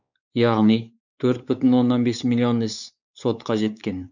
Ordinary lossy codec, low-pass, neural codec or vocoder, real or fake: none; 7.2 kHz; codec, 16 kHz, 4 kbps, X-Codec, WavLM features, trained on Multilingual LibriSpeech; fake